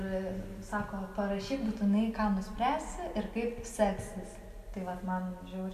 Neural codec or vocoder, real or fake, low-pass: none; real; 14.4 kHz